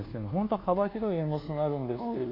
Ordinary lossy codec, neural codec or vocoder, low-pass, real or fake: none; codec, 24 kHz, 1.2 kbps, DualCodec; 5.4 kHz; fake